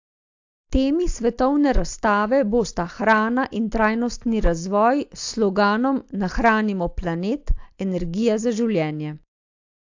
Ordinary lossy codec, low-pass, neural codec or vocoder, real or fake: AAC, 48 kbps; 7.2 kHz; none; real